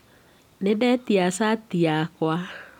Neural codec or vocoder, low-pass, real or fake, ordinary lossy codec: none; 19.8 kHz; real; none